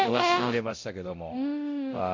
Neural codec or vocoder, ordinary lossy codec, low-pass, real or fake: codec, 24 kHz, 0.9 kbps, DualCodec; MP3, 48 kbps; 7.2 kHz; fake